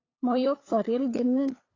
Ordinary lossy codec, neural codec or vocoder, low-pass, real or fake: AAC, 32 kbps; codec, 16 kHz, 8 kbps, FunCodec, trained on LibriTTS, 25 frames a second; 7.2 kHz; fake